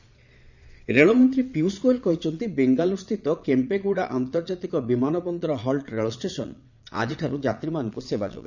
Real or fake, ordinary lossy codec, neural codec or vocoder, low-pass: fake; none; vocoder, 22.05 kHz, 80 mel bands, Vocos; 7.2 kHz